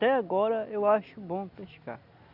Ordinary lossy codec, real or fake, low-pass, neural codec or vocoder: none; real; 5.4 kHz; none